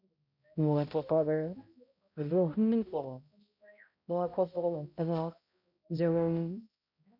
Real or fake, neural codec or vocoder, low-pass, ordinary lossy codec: fake; codec, 16 kHz, 0.5 kbps, X-Codec, HuBERT features, trained on balanced general audio; 5.4 kHz; none